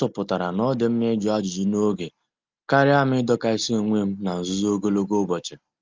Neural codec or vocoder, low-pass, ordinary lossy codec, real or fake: none; 7.2 kHz; Opus, 24 kbps; real